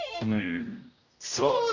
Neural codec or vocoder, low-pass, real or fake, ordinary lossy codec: codec, 16 kHz, 0.5 kbps, X-Codec, HuBERT features, trained on general audio; 7.2 kHz; fake; none